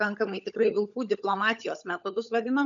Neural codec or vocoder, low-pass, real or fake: codec, 16 kHz, 16 kbps, FunCodec, trained on LibriTTS, 50 frames a second; 7.2 kHz; fake